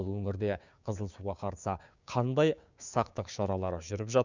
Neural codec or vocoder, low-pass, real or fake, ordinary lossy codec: codec, 16 kHz, 6 kbps, DAC; 7.2 kHz; fake; Opus, 64 kbps